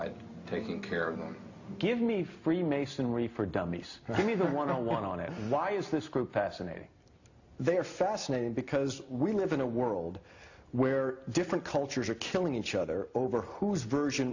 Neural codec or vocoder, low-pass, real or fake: none; 7.2 kHz; real